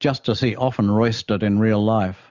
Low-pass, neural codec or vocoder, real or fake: 7.2 kHz; none; real